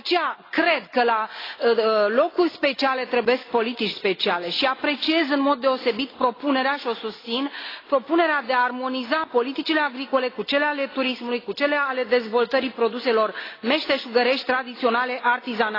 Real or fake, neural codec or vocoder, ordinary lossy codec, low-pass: real; none; AAC, 24 kbps; 5.4 kHz